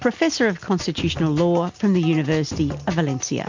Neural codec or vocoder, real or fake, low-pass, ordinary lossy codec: none; real; 7.2 kHz; MP3, 48 kbps